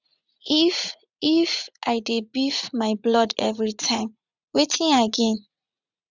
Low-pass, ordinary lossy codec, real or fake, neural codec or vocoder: 7.2 kHz; none; fake; vocoder, 44.1 kHz, 80 mel bands, Vocos